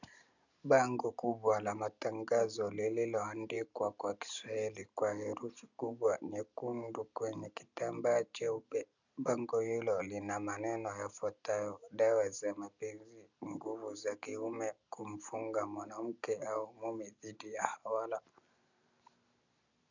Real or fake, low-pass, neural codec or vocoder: real; 7.2 kHz; none